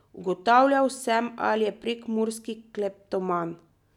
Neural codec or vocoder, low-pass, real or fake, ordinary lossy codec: none; 19.8 kHz; real; none